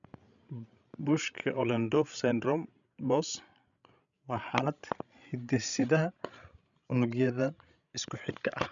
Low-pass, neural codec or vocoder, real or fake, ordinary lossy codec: 7.2 kHz; codec, 16 kHz, 16 kbps, FreqCodec, larger model; fake; none